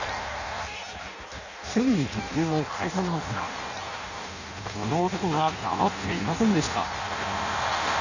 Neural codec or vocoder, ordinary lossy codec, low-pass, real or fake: codec, 16 kHz in and 24 kHz out, 0.6 kbps, FireRedTTS-2 codec; none; 7.2 kHz; fake